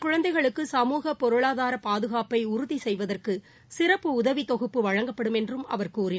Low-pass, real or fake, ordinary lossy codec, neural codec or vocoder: none; real; none; none